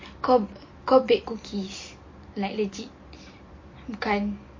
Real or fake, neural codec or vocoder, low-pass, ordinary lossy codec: real; none; 7.2 kHz; MP3, 32 kbps